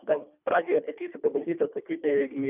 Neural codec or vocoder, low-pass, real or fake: codec, 24 kHz, 1.5 kbps, HILCodec; 3.6 kHz; fake